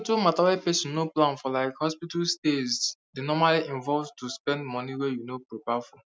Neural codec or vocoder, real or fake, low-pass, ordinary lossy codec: none; real; none; none